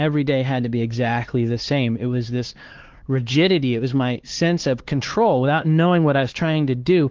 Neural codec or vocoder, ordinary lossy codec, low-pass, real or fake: codec, 16 kHz, 4 kbps, X-Codec, HuBERT features, trained on LibriSpeech; Opus, 16 kbps; 7.2 kHz; fake